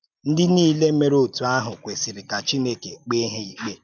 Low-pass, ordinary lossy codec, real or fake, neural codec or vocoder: 7.2 kHz; none; real; none